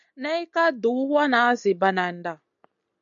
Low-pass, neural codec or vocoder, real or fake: 7.2 kHz; none; real